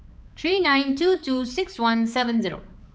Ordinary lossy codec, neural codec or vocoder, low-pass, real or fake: none; codec, 16 kHz, 4 kbps, X-Codec, HuBERT features, trained on balanced general audio; none; fake